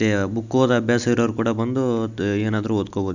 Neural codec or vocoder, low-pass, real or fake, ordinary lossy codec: none; 7.2 kHz; real; none